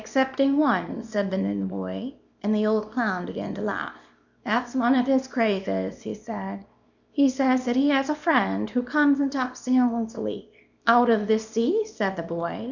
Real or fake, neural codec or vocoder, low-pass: fake; codec, 24 kHz, 0.9 kbps, WavTokenizer, small release; 7.2 kHz